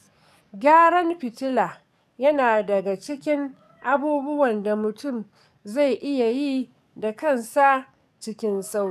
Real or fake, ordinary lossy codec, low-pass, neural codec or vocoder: fake; none; 14.4 kHz; codec, 44.1 kHz, 7.8 kbps, DAC